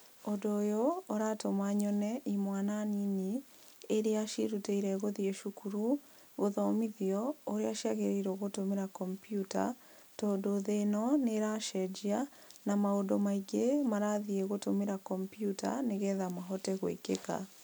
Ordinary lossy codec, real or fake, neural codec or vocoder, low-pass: none; real; none; none